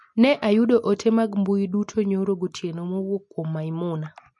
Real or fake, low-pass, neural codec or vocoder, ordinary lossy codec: real; 10.8 kHz; none; MP3, 48 kbps